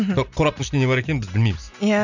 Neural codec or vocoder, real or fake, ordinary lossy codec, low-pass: none; real; none; 7.2 kHz